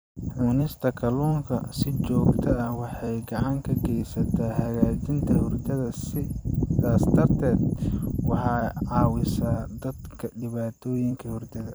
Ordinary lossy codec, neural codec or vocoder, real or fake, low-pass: none; vocoder, 44.1 kHz, 128 mel bands every 256 samples, BigVGAN v2; fake; none